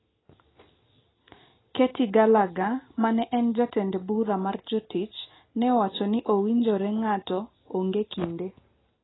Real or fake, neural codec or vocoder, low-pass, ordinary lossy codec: real; none; 7.2 kHz; AAC, 16 kbps